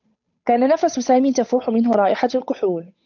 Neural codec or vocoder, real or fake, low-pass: codec, 16 kHz, 8 kbps, FunCodec, trained on Chinese and English, 25 frames a second; fake; 7.2 kHz